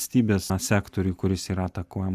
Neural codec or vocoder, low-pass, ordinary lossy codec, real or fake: none; 14.4 kHz; Opus, 64 kbps; real